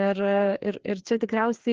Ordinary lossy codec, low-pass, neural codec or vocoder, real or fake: Opus, 16 kbps; 7.2 kHz; codec, 16 kHz, 2 kbps, FreqCodec, larger model; fake